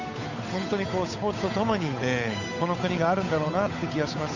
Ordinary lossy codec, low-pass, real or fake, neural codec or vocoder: none; 7.2 kHz; fake; codec, 16 kHz, 8 kbps, FunCodec, trained on Chinese and English, 25 frames a second